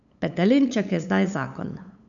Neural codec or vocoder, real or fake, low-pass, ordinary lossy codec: codec, 16 kHz, 8 kbps, FunCodec, trained on LibriTTS, 25 frames a second; fake; 7.2 kHz; none